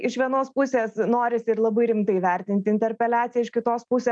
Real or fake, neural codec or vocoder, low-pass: real; none; 9.9 kHz